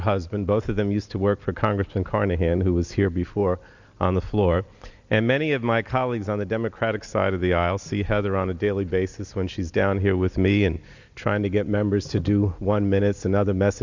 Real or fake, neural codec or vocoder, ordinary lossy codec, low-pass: real; none; Opus, 64 kbps; 7.2 kHz